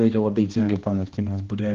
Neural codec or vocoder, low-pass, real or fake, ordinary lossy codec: codec, 16 kHz, 1 kbps, X-Codec, HuBERT features, trained on balanced general audio; 7.2 kHz; fake; Opus, 16 kbps